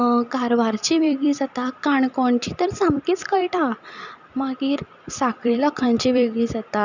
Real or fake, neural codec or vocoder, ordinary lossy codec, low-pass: fake; vocoder, 44.1 kHz, 128 mel bands every 256 samples, BigVGAN v2; none; 7.2 kHz